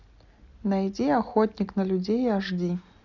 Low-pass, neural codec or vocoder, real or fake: 7.2 kHz; none; real